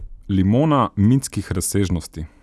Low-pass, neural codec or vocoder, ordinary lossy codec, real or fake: none; none; none; real